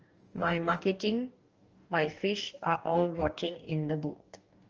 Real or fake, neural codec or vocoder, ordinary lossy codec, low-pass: fake; codec, 44.1 kHz, 2.6 kbps, DAC; Opus, 24 kbps; 7.2 kHz